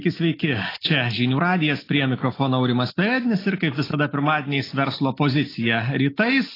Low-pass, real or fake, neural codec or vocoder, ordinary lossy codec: 5.4 kHz; real; none; AAC, 24 kbps